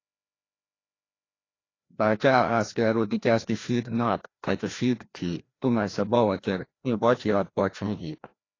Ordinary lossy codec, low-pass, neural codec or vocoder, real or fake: AAC, 32 kbps; 7.2 kHz; codec, 16 kHz, 1 kbps, FreqCodec, larger model; fake